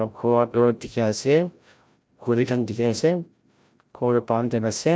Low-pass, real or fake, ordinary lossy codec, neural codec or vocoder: none; fake; none; codec, 16 kHz, 0.5 kbps, FreqCodec, larger model